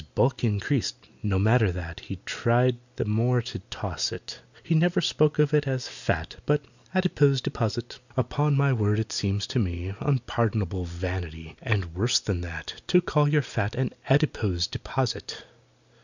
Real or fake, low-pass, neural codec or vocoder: real; 7.2 kHz; none